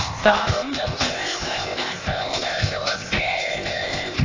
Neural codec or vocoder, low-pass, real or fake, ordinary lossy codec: codec, 16 kHz, 0.8 kbps, ZipCodec; 7.2 kHz; fake; AAC, 32 kbps